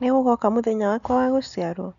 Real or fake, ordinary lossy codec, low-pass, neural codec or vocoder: real; none; 7.2 kHz; none